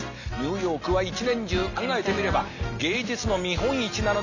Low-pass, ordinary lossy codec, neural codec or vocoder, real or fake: 7.2 kHz; none; none; real